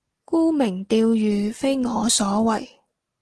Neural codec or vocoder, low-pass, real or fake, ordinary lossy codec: none; 10.8 kHz; real; Opus, 16 kbps